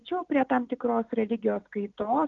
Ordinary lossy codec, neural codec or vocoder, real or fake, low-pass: Opus, 32 kbps; none; real; 7.2 kHz